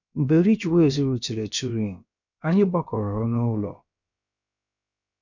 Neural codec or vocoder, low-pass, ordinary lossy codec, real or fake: codec, 16 kHz, about 1 kbps, DyCAST, with the encoder's durations; 7.2 kHz; none; fake